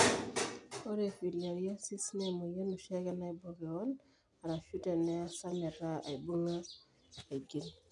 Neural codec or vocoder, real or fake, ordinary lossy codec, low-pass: none; real; none; 10.8 kHz